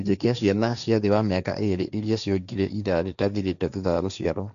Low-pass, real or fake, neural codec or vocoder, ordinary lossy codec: 7.2 kHz; fake; codec, 16 kHz, 1.1 kbps, Voila-Tokenizer; none